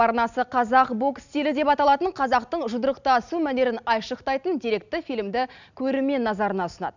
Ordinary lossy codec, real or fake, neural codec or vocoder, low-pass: none; real; none; 7.2 kHz